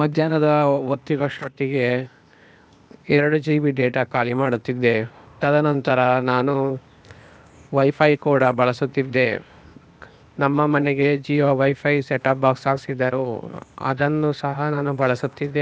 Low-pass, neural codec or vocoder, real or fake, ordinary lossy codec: none; codec, 16 kHz, 0.8 kbps, ZipCodec; fake; none